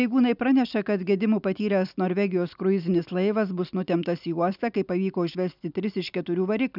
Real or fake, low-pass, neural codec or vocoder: real; 5.4 kHz; none